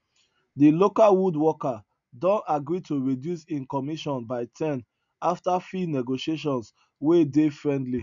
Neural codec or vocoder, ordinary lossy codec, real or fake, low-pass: none; none; real; 7.2 kHz